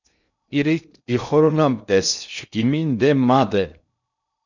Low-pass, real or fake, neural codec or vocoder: 7.2 kHz; fake; codec, 16 kHz in and 24 kHz out, 0.6 kbps, FocalCodec, streaming, 2048 codes